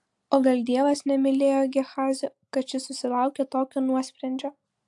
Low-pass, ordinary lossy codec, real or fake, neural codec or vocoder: 10.8 kHz; AAC, 64 kbps; real; none